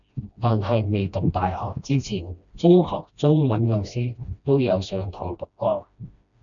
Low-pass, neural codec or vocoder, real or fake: 7.2 kHz; codec, 16 kHz, 1 kbps, FreqCodec, smaller model; fake